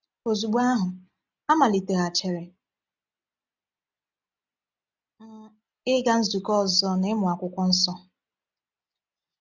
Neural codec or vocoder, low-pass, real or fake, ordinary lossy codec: none; 7.2 kHz; real; none